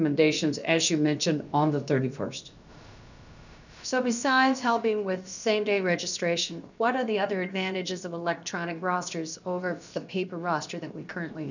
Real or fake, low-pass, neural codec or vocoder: fake; 7.2 kHz; codec, 16 kHz, about 1 kbps, DyCAST, with the encoder's durations